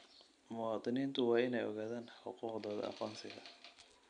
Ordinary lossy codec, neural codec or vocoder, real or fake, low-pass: none; none; real; 9.9 kHz